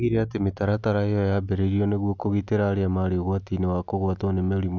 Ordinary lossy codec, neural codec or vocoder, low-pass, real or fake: none; none; 7.2 kHz; real